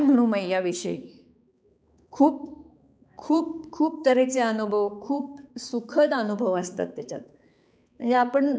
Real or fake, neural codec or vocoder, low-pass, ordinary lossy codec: fake; codec, 16 kHz, 4 kbps, X-Codec, HuBERT features, trained on balanced general audio; none; none